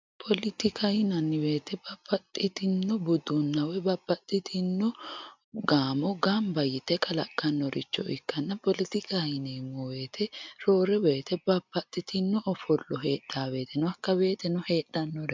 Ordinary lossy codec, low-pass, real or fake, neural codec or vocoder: MP3, 64 kbps; 7.2 kHz; real; none